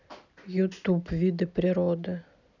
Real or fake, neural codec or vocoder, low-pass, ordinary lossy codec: real; none; 7.2 kHz; none